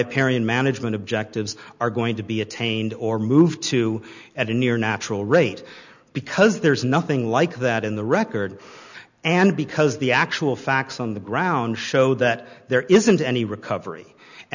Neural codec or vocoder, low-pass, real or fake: none; 7.2 kHz; real